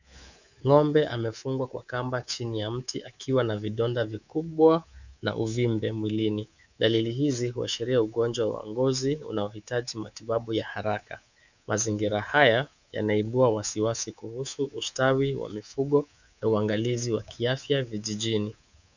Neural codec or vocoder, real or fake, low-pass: codec, 24 kHz, 3.1 kbps, DualCodec; fake; 7.2 kHz